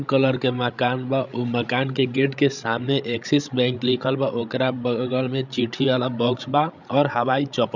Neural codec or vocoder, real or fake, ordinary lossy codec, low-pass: codec, 16 kHz, 16 kbps, FreqCodec, larger model; fake; none; 7.2 kHz